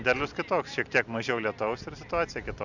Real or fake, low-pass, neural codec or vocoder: real; 7.2 kHz; none